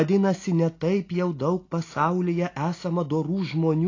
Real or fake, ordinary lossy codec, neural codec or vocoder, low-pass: real; AAC, 32 kbps; none; 7.2 kHz